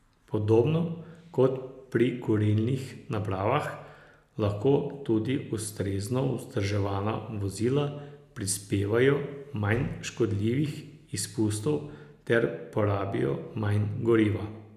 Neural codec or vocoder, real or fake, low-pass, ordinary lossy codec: none; real; 14.4 kHz; none